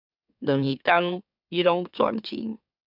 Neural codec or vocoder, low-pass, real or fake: autoencoder, 44.1 kHz, a latent of 192 numbers a frame, MeloTTS; 5.4 kHz; fake